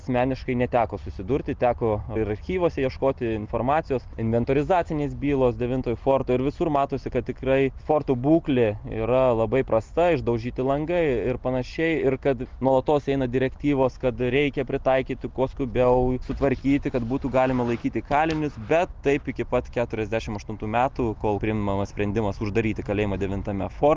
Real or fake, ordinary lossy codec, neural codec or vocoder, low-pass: real; Opus, 24 kbps; none; 7.2 kHz